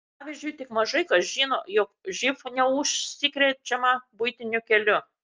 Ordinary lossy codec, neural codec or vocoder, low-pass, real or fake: Opus, 24 kbps; none; 7.2 kHz; real